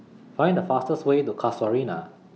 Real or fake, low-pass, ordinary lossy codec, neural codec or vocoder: real; none; none; none